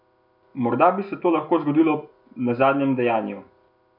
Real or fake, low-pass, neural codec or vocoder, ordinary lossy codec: real; 5.4 kHz; none; none